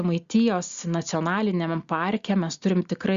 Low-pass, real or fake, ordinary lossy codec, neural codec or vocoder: 7.2 kHz; real; Opus, 64 kbps; none